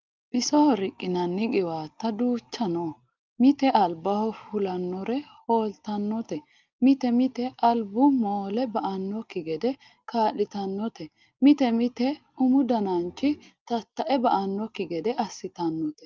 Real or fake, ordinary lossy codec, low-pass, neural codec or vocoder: real; Opus, 24 kbps; 7.2 kHz; none